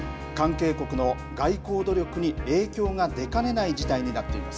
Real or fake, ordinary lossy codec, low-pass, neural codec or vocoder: real; none; none; none